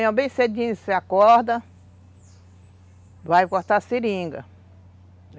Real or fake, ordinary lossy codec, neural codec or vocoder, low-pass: real; none; none; none